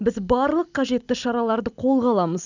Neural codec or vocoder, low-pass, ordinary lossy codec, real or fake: none; 7.2 kHz; none; real